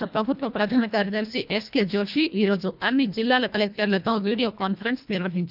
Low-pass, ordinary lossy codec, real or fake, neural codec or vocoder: 5.4 kHz; none; fake; codec, 24 kHz, 1.5 kbps, HILCodec